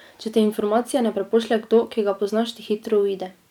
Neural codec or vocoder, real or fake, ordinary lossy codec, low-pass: vocoder, 44.1 kHz, 128 mel bands every 256 samples, BigVGAN v2; fake; none; 19.8 kHz